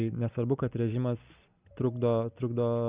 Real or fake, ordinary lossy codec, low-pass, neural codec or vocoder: real; Opus, 64 kbps; 3.6 kHz; none